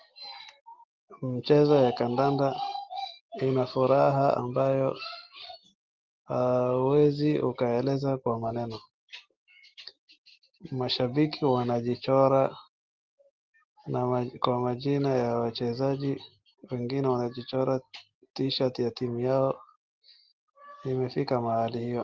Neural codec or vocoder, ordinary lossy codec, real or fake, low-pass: none; Opus, 16 kbps; real; 7.2 kHz